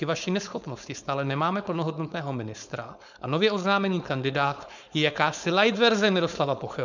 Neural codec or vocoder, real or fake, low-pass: codec, 16 kHz, 4.8 kbps, FACodec; fake; 7.2 kHz